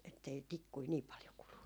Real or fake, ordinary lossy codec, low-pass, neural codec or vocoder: real; none; none; none